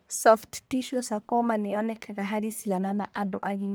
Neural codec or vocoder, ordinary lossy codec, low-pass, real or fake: codec, 44.1 kHz, 1.7 kbps, Pupu-Codec; none; none; fake